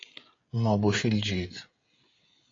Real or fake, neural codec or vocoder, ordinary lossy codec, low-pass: fake; codec, 16 kHz, 16 kbps, FreqCodec, smaller model; AAC, 32 kbps; 7.2 kHz